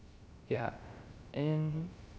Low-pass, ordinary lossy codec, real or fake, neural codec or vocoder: none; none; fake; codec, 16 kHz, 0.3 kbps, FocalCodec